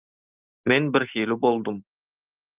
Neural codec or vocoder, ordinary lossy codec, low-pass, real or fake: none; Opus, 24 kbps; 3.6 kHz; real